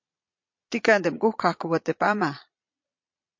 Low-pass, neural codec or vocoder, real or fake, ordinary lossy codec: 7.2 kHz; vocoder, 22.05 kHz, 80 mel bands, Vocos; fake; MP3, 48 kbps